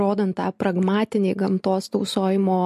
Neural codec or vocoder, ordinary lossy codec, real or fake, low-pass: none; MP3, 64 kbps; real; 14.4 kHz